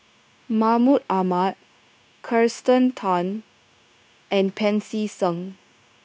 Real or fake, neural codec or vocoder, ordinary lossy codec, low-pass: fake; codec, 16 kHz, 0.9 kbps, LongCat-Audio-Codec; none; none